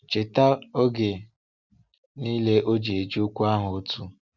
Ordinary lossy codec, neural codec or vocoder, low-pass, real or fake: Opus, 64 kbps; none; 7.2 kHz; real